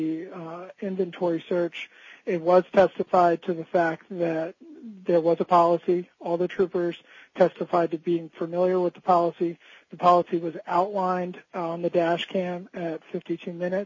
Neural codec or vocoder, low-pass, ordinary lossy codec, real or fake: none; 7.2 kHz; MP3, 32 kbps; real